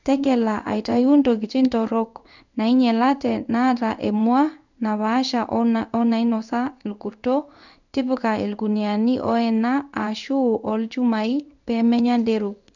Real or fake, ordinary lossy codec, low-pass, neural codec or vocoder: fake; none; 7.2 kHz; codec, 16 kHz in and 24 kHz out, 1 kbps, XY-Tokenizer